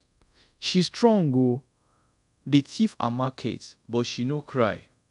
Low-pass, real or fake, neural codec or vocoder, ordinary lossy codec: 10.8 kHz; fake; codec, 24 kHz, 0.5 kbps, DualCodec; none